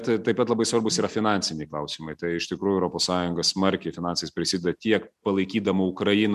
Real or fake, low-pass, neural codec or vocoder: real; 14.4 kHz; none